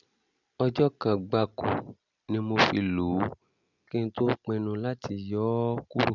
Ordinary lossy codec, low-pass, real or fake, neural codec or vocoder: none; 7.2 kHz; real; none